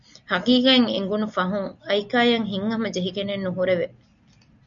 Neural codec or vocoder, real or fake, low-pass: none; real; 7.2 kHz